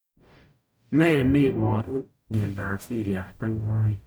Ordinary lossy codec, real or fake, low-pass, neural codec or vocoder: none; fake; none; codec, 44.1 kHz, 0.9 kbps, DAC